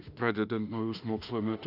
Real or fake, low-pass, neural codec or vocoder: fake; 5.4 kHz; autoencoder, 48 kHz, 32 numbers a frame, DAC-VAE, trained on Japanese speech